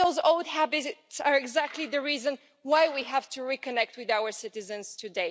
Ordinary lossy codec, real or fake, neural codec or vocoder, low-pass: none; real; none; none